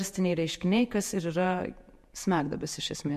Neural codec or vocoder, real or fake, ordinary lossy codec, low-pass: vocoder, 48 kHz, 128 mel bands, Vocos; fake; MP3, 64 kbps; 14.4 kHz